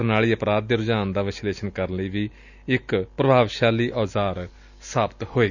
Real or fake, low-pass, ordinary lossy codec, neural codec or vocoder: real; 7.2 kHz; none; none